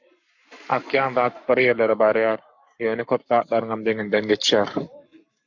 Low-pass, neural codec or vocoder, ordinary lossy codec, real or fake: 7.2 kHz; codec, 44.1 kHz, 7.8 kbps, Pupu-Codec; MP3, 64 kbps; fake